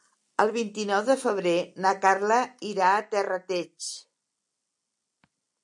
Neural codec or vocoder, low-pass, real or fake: none; 10.8 kHz; real